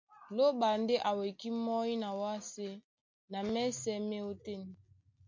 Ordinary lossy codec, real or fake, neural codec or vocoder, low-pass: MP3, 48 kbps; real; none; 7.2 kHz